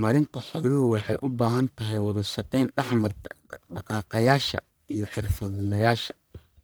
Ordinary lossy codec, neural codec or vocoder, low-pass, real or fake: none; codec, 44.1 kHz, 1.7 kbps, Pupu-Codec; none; fake